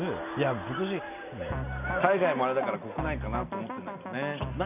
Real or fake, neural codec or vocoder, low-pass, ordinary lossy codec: real; none; 3.6 kHz; none